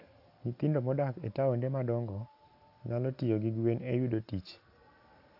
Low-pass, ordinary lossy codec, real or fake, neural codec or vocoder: 5.4 kHz; AAC, 48 kbps; real; none